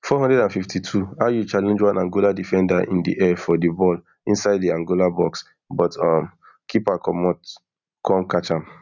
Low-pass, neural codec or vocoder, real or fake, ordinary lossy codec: 7.2 kHz; none; real; none